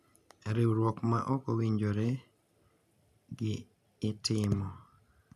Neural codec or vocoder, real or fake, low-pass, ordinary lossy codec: none; real; 14.4 kHz; none